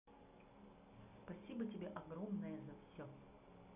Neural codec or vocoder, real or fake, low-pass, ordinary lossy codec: none; real; 3.6 kHz; none